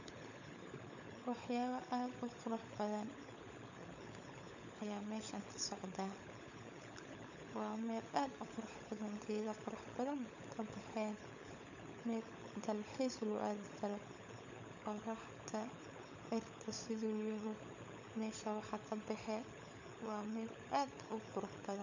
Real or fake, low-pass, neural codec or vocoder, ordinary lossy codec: fake; 7.2 kHz; codec, 16 kHz, 16 kbps, FunCodec, trained on LibriTTS, 50 frames a second; none